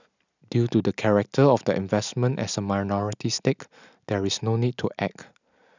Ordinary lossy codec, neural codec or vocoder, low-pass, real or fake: none; none; 7.2 kHz; real